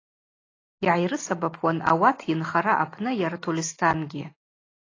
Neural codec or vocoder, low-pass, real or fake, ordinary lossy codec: none; 7.2 kHz; real; AAC, 32 kbps